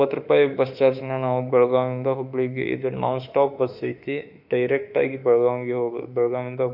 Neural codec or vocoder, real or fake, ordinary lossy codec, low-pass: autoencoder, 48 kHz, 32 numbers a frame, DAC-VAE, trained on Japanese speech; fake; none; 5.4 kHz